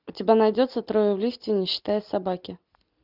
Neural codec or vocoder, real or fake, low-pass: none; real; 5.4 kHz